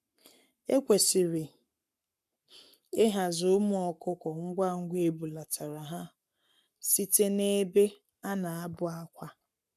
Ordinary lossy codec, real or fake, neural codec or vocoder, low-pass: none; fake; codec, 44.1 kHz, 7.8 kbps, Pupu-Codec; 14.4 kHz